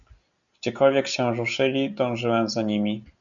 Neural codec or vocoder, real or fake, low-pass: none; real; 7.2 kHz